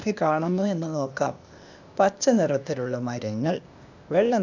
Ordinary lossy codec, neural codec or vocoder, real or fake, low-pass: none; codec, 16 kHz, 0.8 kbps, ZipCodec; fake; 7.2 kHz